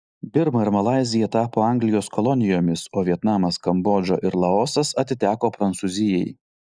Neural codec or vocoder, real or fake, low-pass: none; real; 9.9 kHz